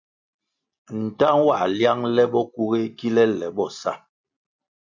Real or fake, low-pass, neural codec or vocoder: real; 7.2 kHz; none